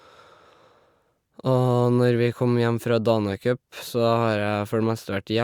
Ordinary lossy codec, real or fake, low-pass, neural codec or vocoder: Opus, 64 kbps; real; 19.8 kHz; none